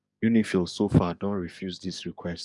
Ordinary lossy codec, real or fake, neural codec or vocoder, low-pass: none; fake; codec, 44.1 kHz, 7.8 kbps, DAC; 10.8 kHz